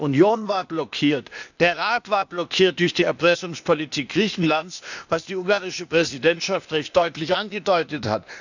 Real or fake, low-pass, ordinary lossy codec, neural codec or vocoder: fake; 7.2 kHz; none; codec, 16 kHz, 0.8 kbps, ZipCodec